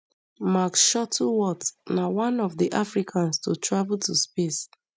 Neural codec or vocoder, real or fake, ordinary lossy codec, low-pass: none; real; none; none